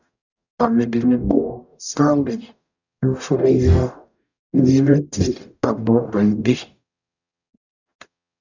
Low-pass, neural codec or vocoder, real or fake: 7.2 kHz; codec, 44.1 kHz, 0.9 kbps, DAC; fake